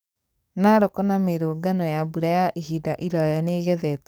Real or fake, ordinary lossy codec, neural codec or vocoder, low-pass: fake; none; codec, 44.1 kHz, 7.8 kbps, DAC; none